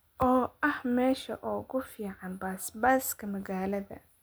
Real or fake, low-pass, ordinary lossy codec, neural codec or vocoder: real; none; none; none